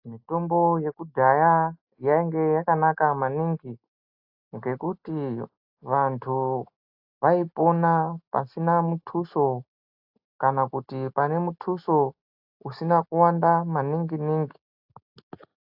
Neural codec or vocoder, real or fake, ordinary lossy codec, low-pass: none; real; AAC, 48 kbps; 5.4 kHz